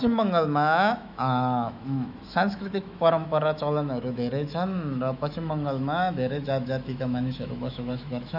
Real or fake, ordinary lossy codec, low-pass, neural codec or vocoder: fake; none; 5.4 kHz; autoencoder, 48 kHz, 128 numbers a frame, DAC-VAE, trained on Japanese speech